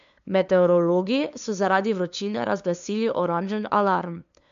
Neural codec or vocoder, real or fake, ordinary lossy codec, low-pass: codec, 16 kHz, 2 kbps, FunCodec, trained on LibriTTS, 25 frames a second; fake; MP3, 64 kbps; 7.2 kHz